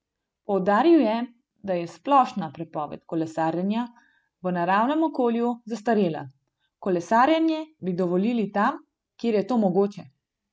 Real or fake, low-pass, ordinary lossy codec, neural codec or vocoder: real; none; none; none